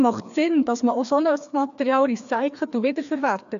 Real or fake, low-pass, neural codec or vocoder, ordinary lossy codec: fake; 7.2 kHz; codec, 16 kHz, 2 kbps, FreqCodec, larger model; none